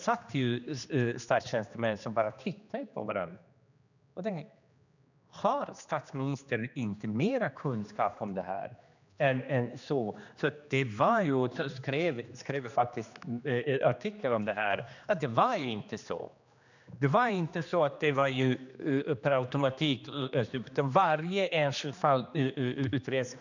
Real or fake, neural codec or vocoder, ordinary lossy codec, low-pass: fake; codec, 16 kHz, 2 kbps, X-Codec, HuBERT features, trained on general audio; none; 7.2 kHz